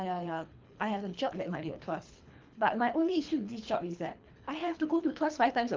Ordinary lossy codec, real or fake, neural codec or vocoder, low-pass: Opus, 24 kbps; fake; codec, 24 kHz, 3 kbps, HILCodec; 7.2 kHz